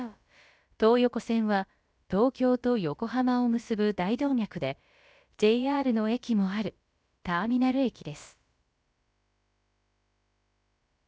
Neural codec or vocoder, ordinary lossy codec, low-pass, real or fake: codec, 16 kHz, about 1 kbps, DyCAST, with the encoder's durations; none; none; fake